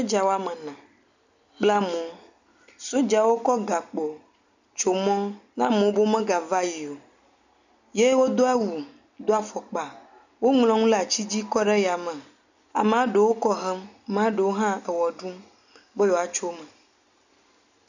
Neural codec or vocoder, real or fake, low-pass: none; real; 7.2 kHz